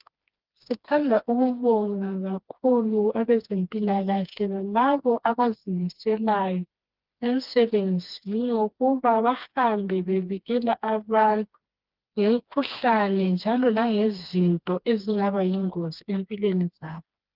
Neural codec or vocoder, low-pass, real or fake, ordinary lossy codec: codec, 16 kHz, 2 kbps, FreqCodec, smaller model; 5.4 kHz; fake; Opus, 32 kbps